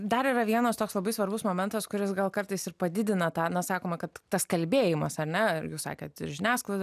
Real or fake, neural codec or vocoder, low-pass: real; none; 14.4 kHz